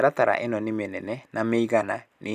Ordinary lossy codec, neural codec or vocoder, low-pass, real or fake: none; none; 14.4 kHz; real